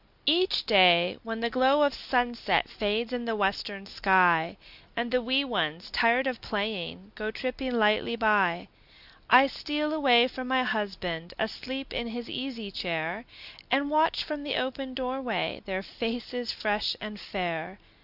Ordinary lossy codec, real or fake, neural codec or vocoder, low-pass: Opus, 64 kbps; real; none; 5.4 kHz